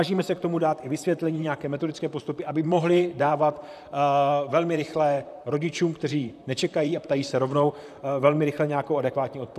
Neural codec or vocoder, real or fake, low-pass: vocoder, 44.1 kHz, 128 mel bands, Pupu-Vocoder; fake; 14.4 kHz